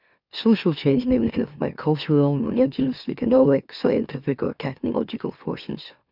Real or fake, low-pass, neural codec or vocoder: fake; 5.4 kHz; autoencoder, 44.1 kHz, a latent of 192 numbers a frame, MeloTTS